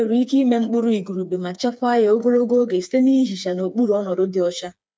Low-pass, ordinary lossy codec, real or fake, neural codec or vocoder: none; none; fake; codec, 16 kHz, 4 kbps, FreqCodec, smaller model